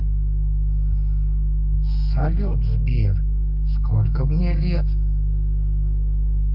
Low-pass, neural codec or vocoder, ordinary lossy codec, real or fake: 5.4 kHz; codec, 32 kHz, 1.9 kbps, SNAC; AAC, 48 kbps; fake